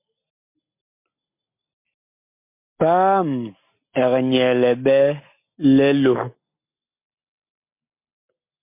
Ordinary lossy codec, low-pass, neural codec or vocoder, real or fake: MP3, 24 kbps; 3.6 kHz; none; real